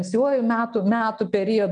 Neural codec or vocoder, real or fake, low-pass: none; real; 9.9 kHz